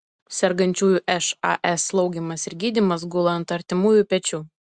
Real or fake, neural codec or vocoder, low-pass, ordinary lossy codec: real; none; 9.9 kHz; Opus, 64 kbps